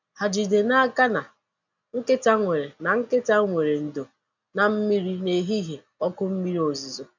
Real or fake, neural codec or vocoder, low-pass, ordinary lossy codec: real; none; 7.2 kHz; none